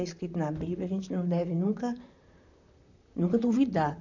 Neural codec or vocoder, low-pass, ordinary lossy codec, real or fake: vocoder, 44.1 kHz, 128 mel bands, Pupu-Vocoder; 7.2 kHz; none; fake